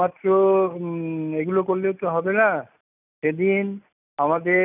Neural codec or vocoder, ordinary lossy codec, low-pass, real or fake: none; none; 3.6 kHz; real